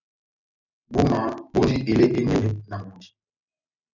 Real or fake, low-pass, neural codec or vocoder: real; 7.2 kHz; none